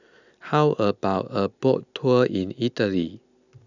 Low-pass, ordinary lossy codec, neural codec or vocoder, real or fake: 7.2 kHz; none; none; real